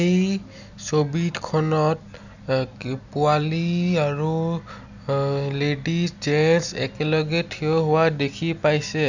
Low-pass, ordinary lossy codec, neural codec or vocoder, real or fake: 7.2 kHz; none; none; real